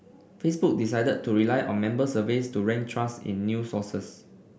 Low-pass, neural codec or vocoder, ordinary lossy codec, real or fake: none; none; none; real